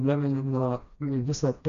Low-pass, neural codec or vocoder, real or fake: 7.2 kHz; codec, 16 kHz, 1 kbps, FreqCodec, smaller model; fake